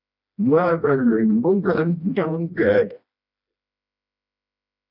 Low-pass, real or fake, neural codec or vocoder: 5.4 kHz; fake; codec, 16 kHz, 1 kbps, FreqCodec, smaller model